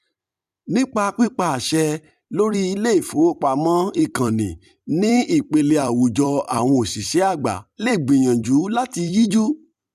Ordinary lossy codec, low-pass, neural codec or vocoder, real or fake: none; 14.4 kHz; vocoder, 44.1 kHz, 128 mel bands every 256 samples, BigVGAN v2; fake